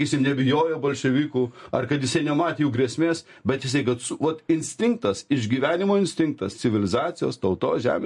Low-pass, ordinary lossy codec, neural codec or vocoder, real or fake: 10.8 kHz; MP3, 48 kbps; none; real